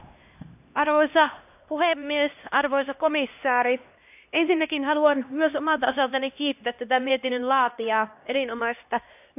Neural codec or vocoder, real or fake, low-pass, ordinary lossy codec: codec, 16 kHz, 1 kbps, X-Codec, HuBERT features, trained on LibriSpeech; fake; 3.6 kHz; AAC, 32 kbps